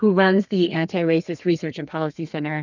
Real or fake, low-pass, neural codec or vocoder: fake; 7.2 kHz; codec, 32 kHz, 1.9 kbps, SNAC